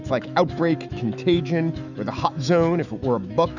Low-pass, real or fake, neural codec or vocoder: 7.2 kHz; fake; autoencoder, 48 kHz, 128 numbers a frame, DAC-VAE, trained on Japanese speech